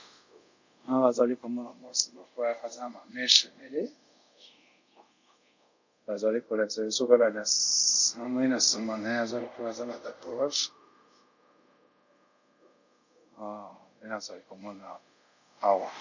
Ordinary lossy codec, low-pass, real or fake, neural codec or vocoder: MP3, 64 kbps; 7.2 kHz; fake; codec, 24 kHz, 0.5 kbps, DualCodec